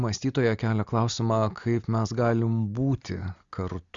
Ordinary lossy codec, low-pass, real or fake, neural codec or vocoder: Opus, 64 kbps; 7.2 kHz; real; none